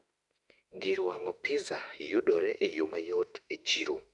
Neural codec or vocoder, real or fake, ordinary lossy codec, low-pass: autoencoder, 48 kHz, 32 numbers a frame, DAC-VAE, trained on Japanese speech; fake; none; 10.8 kHz